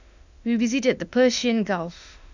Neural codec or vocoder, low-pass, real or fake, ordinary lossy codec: autoencoder, 48 kHz, 32 numbers a frame, DAC-VAE, trained on Japanese speech; 7.2 kHz; fake; none